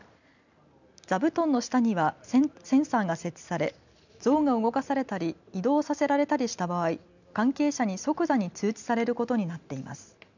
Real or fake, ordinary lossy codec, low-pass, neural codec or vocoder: real; none; 7.2 kHz; none